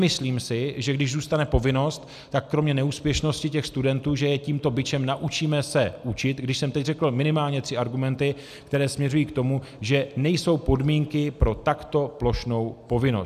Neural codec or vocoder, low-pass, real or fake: none; 14.4 kHz; real